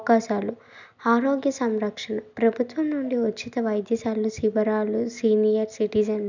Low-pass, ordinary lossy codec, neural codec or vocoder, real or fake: 7.2 kHz; none; none; real